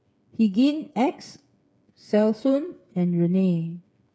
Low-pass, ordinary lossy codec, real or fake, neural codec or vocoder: none; none; fake; codec, 16 kHz, 8 kbps, FreqCodec, smaller model